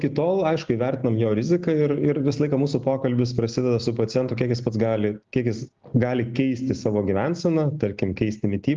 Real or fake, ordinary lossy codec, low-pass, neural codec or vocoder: real; Opus, 32 kbps; 7.2 kHz; none